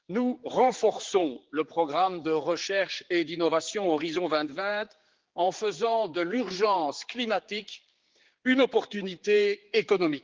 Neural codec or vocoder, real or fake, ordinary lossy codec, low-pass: codec, 16 kHz, 4 kbps, X-Codec, HuBERT features, trained on general audio; fake; Opus, 16 kbps; 7.2 kHz